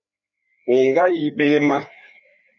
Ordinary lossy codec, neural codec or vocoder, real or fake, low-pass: MP3, 64 kbps; codec, 16 kHz, 2 kbps, FreqCodec, larger model; fake; 7.2 kHz